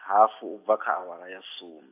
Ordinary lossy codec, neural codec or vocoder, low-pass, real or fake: AAC, 24 kbps; none; 3.6 kHz; real